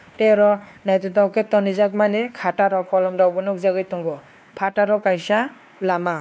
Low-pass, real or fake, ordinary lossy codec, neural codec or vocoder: none; fake; none; codec, 16 kHz, 2 kbps, X-Codec, WavLM features, trained on Multilingual LibriSpeech